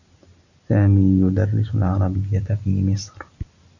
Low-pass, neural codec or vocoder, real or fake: 7.2 kHz; none; real